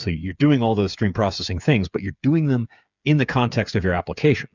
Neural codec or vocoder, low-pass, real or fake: codec, 16 kHz, 8 kbps, FreqCodec, smaller model; 7.2 kHz; fake